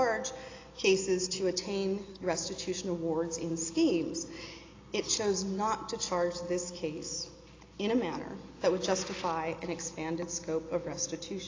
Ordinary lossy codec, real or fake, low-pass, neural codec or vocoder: AAC, 32 kbps; real; 7.2 kHz; none